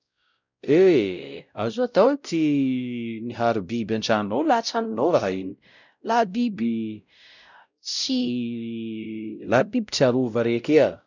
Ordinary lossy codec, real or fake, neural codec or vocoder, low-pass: none; fake; codec, 16 kHz, 0.5 kbps, X-Codec, WavLM features, trained on Multilingual LibriSpeech; 7.2 kHz